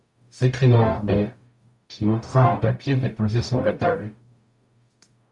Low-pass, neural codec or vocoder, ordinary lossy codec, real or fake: 10.8 kHz; codec, 44.1 kHz, 0.9 kbps, DAC; Opus, 64 kbps; fake